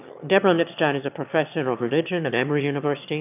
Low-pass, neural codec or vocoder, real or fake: 3.6 kHz; autoencoder, 22.05 kHz, a latent of 192 numbers a frame, VITS, trained on one speaker; fake